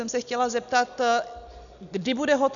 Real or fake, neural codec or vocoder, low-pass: real; none; 7.2 kHz